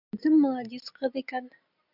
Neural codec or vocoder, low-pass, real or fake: none; 5.4 kHz; real